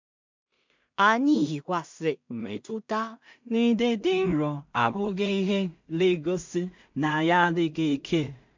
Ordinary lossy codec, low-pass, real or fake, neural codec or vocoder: MP3, 64 kbps; 7.2 kHz; fake; codec, 16 kHz in and 24 kHz out, 0.4 kbps, LongCat-Audio-Codec, two codebook decoder